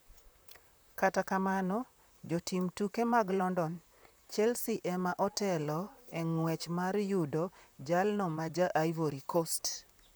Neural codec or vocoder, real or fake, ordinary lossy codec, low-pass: vocoder, 44.1 kHz, 128 mel bands, Pupu-Vocoder; fake; none; none